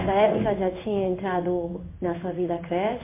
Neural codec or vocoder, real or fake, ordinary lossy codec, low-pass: codec, 16 kHz in and 24 kHz out, 1 kbps, XY-Tokenizer; fake; MP3, 24 kbps; 3.6 kHz